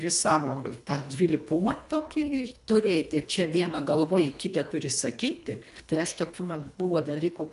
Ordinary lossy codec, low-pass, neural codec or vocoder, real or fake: AAC, 96 kbps; 10.8 kHz; codec, 24 kHz, 1.5 kbps, HILCodec; fake